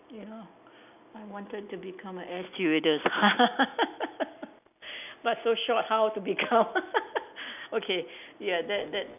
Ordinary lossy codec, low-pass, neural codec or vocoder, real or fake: none; 3.6 kHz; none; real